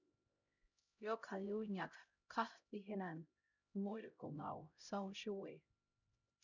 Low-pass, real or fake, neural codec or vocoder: 7.2 kHz; fake; codec, 16 kHz, 0.5 kbps, X-Codec, HuBERT features, trained on LibriSpeech